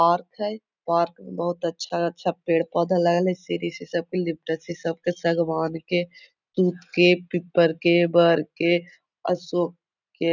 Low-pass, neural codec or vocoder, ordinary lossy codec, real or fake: 7.2 kHz; none; none; real